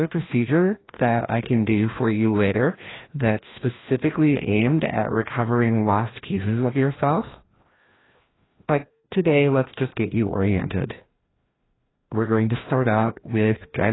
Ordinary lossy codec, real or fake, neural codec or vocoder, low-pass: AAC, 16 kbps; fake; codec, 16 kHz, 1 kbps, FreqCodec, larger model; 7.2 kHz